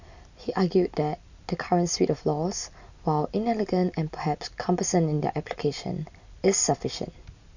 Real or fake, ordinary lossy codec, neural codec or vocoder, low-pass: real; none; none; 7.2 kHz